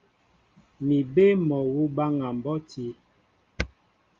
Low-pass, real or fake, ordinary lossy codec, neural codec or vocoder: 7.2 kHz; real; Opus, 32 kbps; none